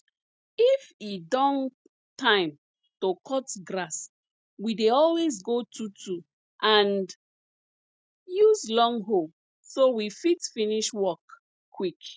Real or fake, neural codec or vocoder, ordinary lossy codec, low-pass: real; none; none; none